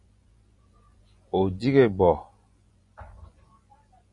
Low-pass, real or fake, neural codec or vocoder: 10.8 kHz; fake; vocoder, 24 kHz, 100 mel bands, Vocos